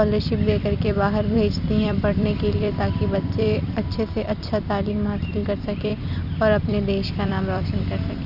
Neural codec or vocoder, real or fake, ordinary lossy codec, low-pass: vocoder, 44.1 kHz, 128 mel bands every 256 samples, BigVGAN v2; fake; Opus, 64 kbps; 5.4 kHz